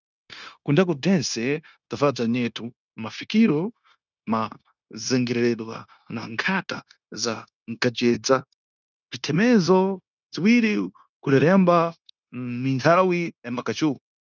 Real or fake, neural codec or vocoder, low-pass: fake; codec, 16 kHz, 0.9 kbps, LongCat-Audio-Codec; 7.2 kHz